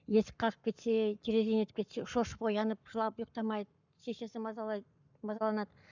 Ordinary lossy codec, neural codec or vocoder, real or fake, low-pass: none; codec, 44.1 kHz, 7.8 kbps, Pupu-Codec; fake; 7.2 kHz